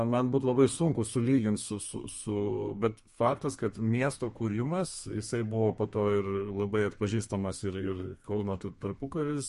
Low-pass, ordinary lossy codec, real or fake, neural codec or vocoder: 14.4 kHz; MP3, 48 kbps; fake; codec, 32 kHz, 1.9 kbps, SNAC